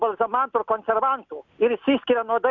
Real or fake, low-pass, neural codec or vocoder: real; 7.2 kHz; none